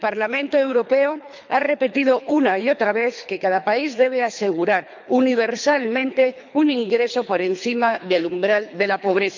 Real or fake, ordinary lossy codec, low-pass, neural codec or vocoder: fake; MP3, 64 kbps; 7.2 kHz; codec, 24 kHz, 3 kbps, HILCodec